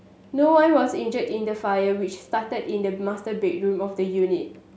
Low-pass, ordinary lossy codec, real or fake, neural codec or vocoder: none; none; real; none